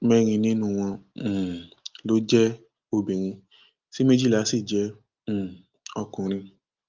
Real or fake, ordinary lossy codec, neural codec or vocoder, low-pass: real; Opus, 32 kbps; none; 7.2 kHz